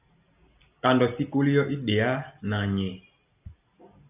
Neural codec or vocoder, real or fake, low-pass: none; real; 3.6 kHz